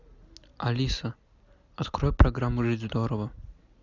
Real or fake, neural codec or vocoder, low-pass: real; none; 7.2 kHz